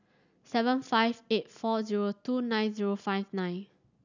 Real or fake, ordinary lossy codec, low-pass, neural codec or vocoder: real; none; 7.2 kHz; none